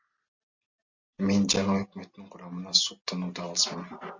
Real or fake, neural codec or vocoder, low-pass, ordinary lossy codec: real; none; 7.2 kHz; MP3, 64 kbps